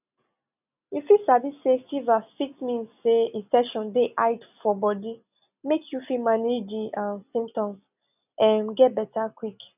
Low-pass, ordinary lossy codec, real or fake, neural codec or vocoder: 3.6 kHz; none; real; none